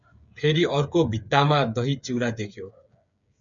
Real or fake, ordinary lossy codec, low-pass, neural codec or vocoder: fake; MP3, 96 kbps; 7.2 kHz; codec, 16 kHz, 8 kbps, FreqCodec, smaller model